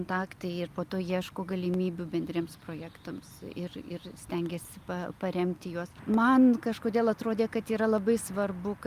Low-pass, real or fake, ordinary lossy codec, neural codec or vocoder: 14.4 kHz; real; Opus, 32 kbps; none